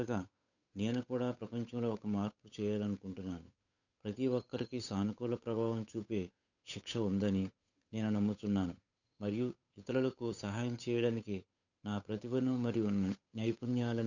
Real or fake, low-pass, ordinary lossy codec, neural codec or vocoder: fake; 7.2 kHz; none; codec, 16 kHz, 8 kbps, FunCodec, trained on Chinese and English, 25 frames a second